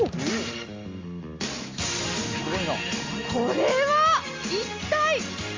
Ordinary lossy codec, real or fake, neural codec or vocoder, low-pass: Opus, 32 kbps; real; none; 7.2 kHz